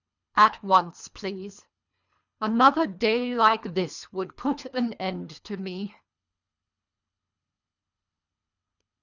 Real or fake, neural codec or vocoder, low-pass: fake; codec, 24 kHz, 3 kbps, HILCodec; 7.2 kHz